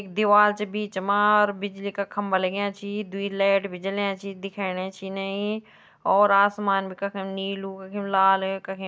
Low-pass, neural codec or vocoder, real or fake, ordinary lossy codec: none; none; real; none